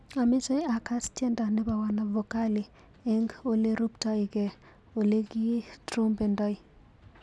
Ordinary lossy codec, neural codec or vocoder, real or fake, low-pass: none; none; real; none